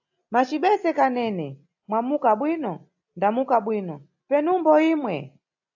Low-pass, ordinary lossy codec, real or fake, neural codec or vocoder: 7.2 kHz; AAC, 48 kbps; real; none